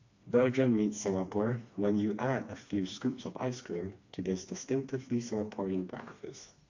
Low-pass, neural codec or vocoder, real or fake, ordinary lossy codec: 7.2 kHz; codec, 16 kHz, 2 kbps, FreqCodec, smaller model; fake; AAC, 48 kbps